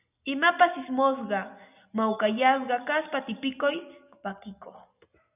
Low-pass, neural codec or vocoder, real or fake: 3.6 kHz; none; real